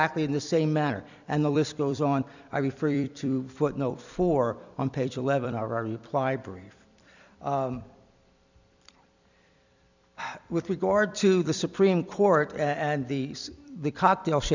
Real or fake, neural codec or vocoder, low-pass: fake; vocoder, 44.1 kHz, 128 mel bands every 256 samples, BigVGAN v2; 7.2 kHz